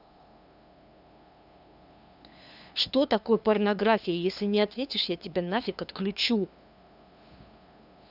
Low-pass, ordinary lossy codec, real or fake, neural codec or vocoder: 5.4 kHz; none; fake; codec, 16 kHz, 2 kbps, FunCodec, trained on LibriTTS, 25 frames a second